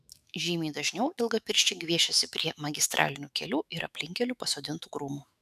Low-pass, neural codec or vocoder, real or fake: 14.4 kHz; autoencoder, 48 kHz, 128 numbers a frame, DAC-VAE, trained on Japanese speech; fake